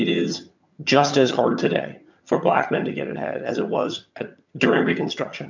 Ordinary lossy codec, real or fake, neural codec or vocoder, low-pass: MP3, 64 kbps; fake; vocoder, 22.05 kHz, 80 mel bands, HiFi-GAN; 7.2 kHz